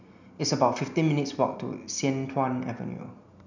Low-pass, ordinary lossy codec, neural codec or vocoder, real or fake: 7.2 kHz; none; none; real